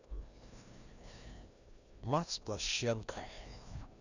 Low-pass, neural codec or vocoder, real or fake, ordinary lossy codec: 7.2 kHz; codec, 16 kHz, 1 kbps, FreqCodec, larger model; fake; none